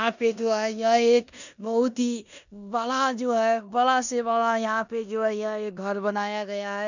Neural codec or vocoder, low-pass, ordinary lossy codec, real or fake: codec, 16 kHz in and 24 kHz out, 0.9 kbps, LongCat-Audio-Codec, four codebook decoder; 7.2 kHz; none; fake